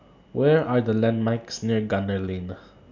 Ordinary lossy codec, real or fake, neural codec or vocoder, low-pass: none; real; none; 7.2 kHz